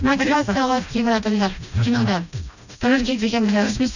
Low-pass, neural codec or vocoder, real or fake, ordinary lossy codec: 7.2 kHz; codec, 16 kHz, 1 kbps, FreqCodec, smaller model; fake; none